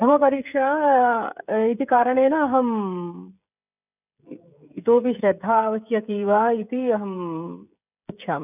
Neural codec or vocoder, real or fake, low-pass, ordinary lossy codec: codec, 16 kHz, 16 kbps, FreqCodec, smaller model; fake; 3.6 kHz; none